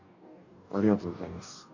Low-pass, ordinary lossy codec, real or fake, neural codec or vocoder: 7.2 kHz; none; fake; codec, 44.1 kHz, 2.6 kbps, DAC